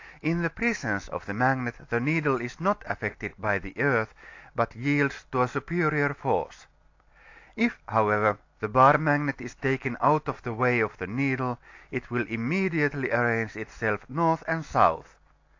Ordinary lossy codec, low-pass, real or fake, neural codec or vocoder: AAC, 48 kbps; 7.2 kHz; real; none